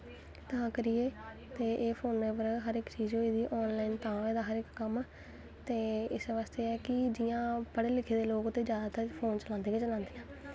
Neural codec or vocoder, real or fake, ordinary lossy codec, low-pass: none; real; none; none